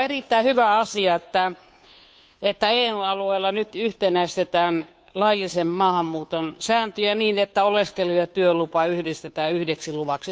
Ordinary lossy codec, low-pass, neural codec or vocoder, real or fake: none; none; codec, 16 kHz, 2 kbps, FunCodec, trained on Chinese and English, 25 frames a second; fake